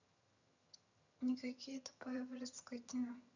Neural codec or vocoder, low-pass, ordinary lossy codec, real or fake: vocoder, 22.05 kHz, 80 mel bands, HiFi-GAN; 7.2 kHz; none; fake